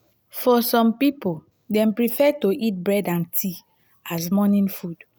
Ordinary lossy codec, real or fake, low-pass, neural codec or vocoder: none; real; none; none